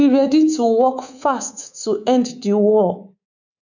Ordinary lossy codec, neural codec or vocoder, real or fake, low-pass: none; codec, 24 kHz, 3.1 kbps, DualCodec; fake; 7.2 kHz